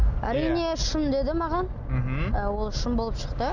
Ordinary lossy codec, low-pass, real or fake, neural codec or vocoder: none; 7.2 kHz; real; none